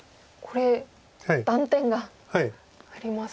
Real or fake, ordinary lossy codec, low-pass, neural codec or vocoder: real; none; none; none